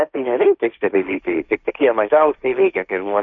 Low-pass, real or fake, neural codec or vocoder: 7.2 kHz; fake; codec, 16 kHz, 1.1 kbps, Voila-Tokenizer